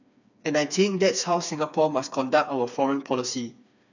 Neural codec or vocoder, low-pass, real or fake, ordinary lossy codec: codec, 16 kHz, 4 kbps, FreqCodec, smaller model; 7.2 kHz; fake; none